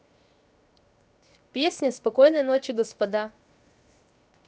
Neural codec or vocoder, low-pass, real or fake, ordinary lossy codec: codec, 16 kHz, 0.7 kbps, FocalCodec; none; fake; none